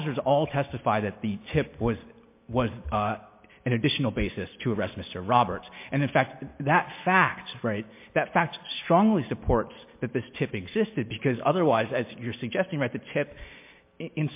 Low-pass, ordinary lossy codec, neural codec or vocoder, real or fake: 3.6 kHz; MP3, 24 kbps; none; real